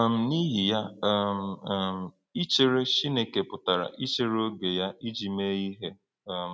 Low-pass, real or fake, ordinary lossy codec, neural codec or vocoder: none; real; none; none